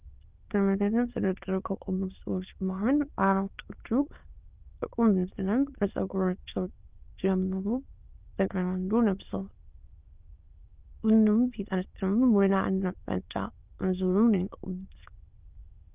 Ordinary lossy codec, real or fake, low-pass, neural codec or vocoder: Opus, 24 kbps; fake; 3.6 kHz; autoencoder, 22.05 kHz, a latent of 192 numbers a frame, VITS, trained on many speakers